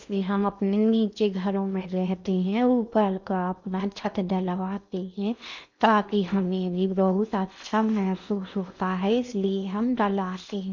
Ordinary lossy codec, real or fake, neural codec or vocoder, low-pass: none; fake; codec, 16 kHz in and 24 kHz out, 0.8 kbps, FocalCodec, streaming, 65536 codes; 7.2 kHz